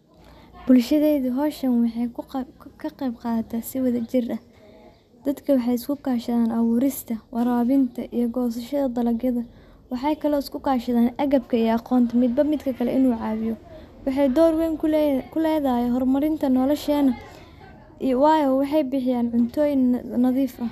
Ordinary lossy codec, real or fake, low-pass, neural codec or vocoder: none; real; 14.4 kHz; none